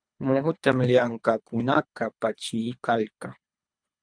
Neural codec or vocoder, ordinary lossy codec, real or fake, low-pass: codec, 24 kHz, 3 kbps, HILCodec; MP3, 96 kbps; fake; 9.9 kHz